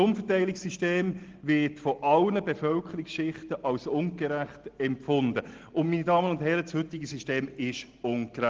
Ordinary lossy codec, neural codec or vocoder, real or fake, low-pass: Opus, 16 kbps; none; real; 7.2 kHz